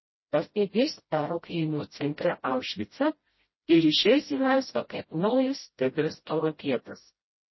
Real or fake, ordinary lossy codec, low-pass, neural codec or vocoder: fake; MP3, 24 kbps; 7.2 kHz; codec, 16 kHz, 0.5 kbps, FreqCodec, smaller model